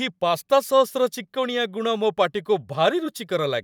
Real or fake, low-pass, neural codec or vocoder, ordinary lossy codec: fake; 19.8 kHz; vocoder, 44.1 kHz, 128 mel bands every 512 samples, BigVGAN v2; none